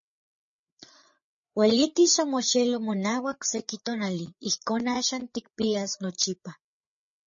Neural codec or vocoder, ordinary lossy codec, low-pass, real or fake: codec, 16 kHz, 8 kbps, FreqCodec, larger model; MP3, 32 kbps; 7.2 kHz; fake